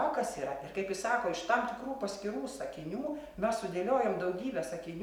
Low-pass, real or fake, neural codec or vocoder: 19.8 kHz; real; none